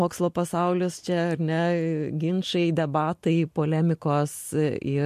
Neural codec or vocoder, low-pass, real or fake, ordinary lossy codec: none; 14.4 kHz; real; MP3, 64 kbps